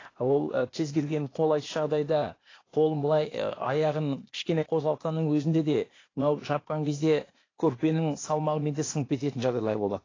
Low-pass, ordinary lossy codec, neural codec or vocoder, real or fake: 7.2 kHz; AAC, 32 kbps; codec, 16 kHz, 0.8 kbps, ZipCodec; fake